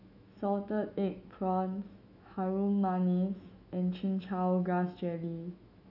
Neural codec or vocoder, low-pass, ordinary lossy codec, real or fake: autoencoder, 48 kHz, 128 numbers a frame, DAC-VAE, trained on Japanese speech; 5.4 kHz; none; fake